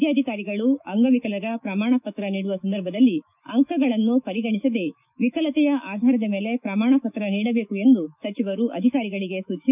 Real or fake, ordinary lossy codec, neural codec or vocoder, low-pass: fake; none; autoencoder, 48 kHz, 128 numbers a frame, DAC-VAE, trained on Japanese speech; 3.6 kHz